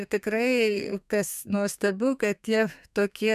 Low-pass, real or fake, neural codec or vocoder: 14.4 kHz; fake; codec, 32 kHz, 1.9 kbps, SNAC